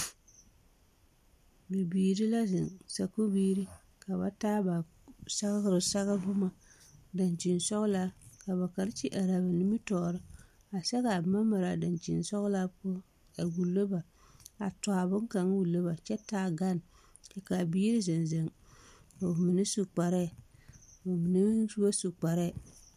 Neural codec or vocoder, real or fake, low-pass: none; real; 14.4 kHz